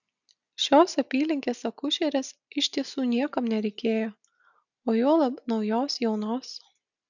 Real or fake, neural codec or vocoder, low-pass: real; none; 7.2 kHz